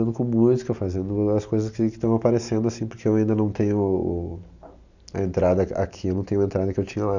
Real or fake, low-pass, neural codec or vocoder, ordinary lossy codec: real; 7.2 kHz; none; none